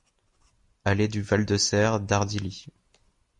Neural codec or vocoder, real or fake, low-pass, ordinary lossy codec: none; real; 10.8 kHz; MP3, 48 kbps